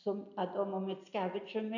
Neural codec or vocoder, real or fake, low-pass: none; real; 7.2 kHz